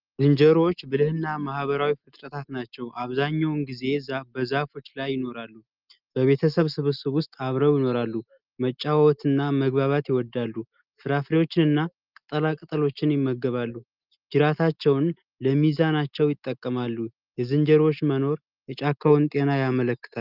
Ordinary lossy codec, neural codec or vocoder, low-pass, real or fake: Opus, 24 kbps; none; 5.4 kHz; real